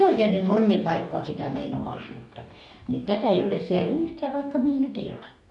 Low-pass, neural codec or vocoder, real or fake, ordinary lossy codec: 10.8 kHz; codec, 44.1 kHz, 2.6 kbps, DAC; fake; none